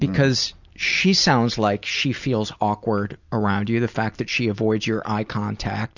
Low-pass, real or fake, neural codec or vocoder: 7.2 kHz; real; none